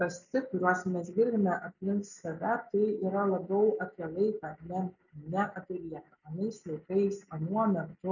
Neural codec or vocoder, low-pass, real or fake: none; 7.2 kHz; real